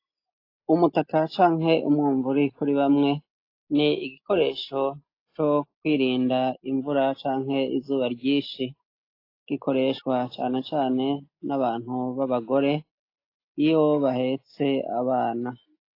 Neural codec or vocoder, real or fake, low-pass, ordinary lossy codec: none; real; 5.4 kHz; AAC, 32 kbps